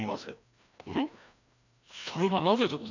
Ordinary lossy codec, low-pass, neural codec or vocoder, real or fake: none; 7.2 kHz; codec, 16 kHz, 1 kbps, FreqCodec, larger model; fake